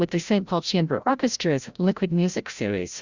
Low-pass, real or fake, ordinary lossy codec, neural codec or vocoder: 7.2 kHz; fake; Opus, 64 kbps; codec, 16 kHz, 0.5 kbps, FreqCodec, larger model